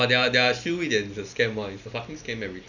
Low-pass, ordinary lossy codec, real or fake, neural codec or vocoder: 7.2 kHz; none; real; none